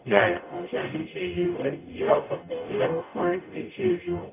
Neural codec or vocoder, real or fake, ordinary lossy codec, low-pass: codec, 44.1 kHz, 0.9 kbps, DAC; fake; none; 3.6 kHz